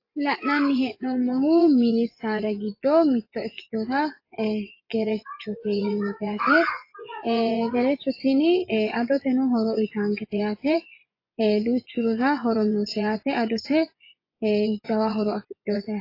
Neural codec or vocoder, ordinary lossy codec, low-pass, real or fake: vocoder, 24 kHz, 100 mel bands, Vocos; AAC, 32 kbps; 5.4 kHz; fake